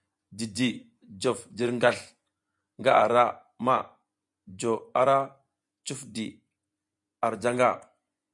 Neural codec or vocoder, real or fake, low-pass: vocoder, 24 kHz, 100 mel bands, Vocos; fake; 10.8 kHz